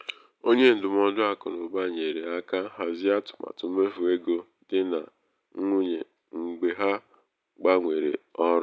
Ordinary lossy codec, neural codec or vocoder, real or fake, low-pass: none; none; real; none